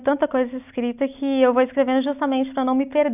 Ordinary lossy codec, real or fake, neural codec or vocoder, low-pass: none; real; none; 3.6 kHz